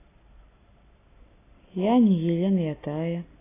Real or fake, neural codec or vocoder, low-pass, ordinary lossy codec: real; none; 3.6 kHz; AAC, 16 kbps